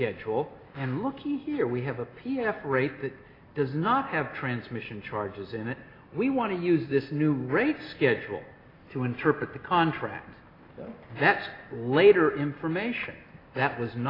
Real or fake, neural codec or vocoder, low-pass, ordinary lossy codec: real; none; 5.4 kHz; AAC, 24 kbps